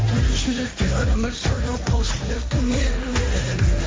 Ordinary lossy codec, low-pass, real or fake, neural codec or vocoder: none; none; fake; codec, 16 kHz, 1.1 kbps, Voila-Tokenizer